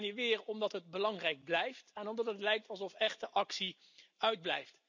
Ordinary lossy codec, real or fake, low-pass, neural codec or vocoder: none; real; 7.2 kHz; none